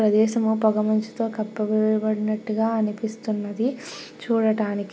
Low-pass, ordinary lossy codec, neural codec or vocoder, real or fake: none; none; none; real